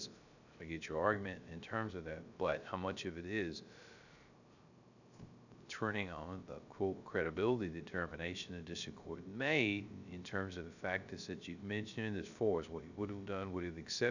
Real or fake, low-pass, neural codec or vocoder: fake; 7.2 kHz; codec, 16 kHz, 0.3 kbps, FocalCodec